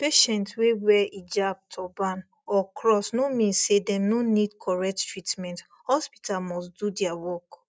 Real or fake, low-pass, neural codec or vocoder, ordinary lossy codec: real; none; none; none